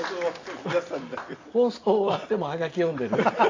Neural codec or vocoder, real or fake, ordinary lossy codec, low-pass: none; real; AAC, 32 kbps; 7.2 kHz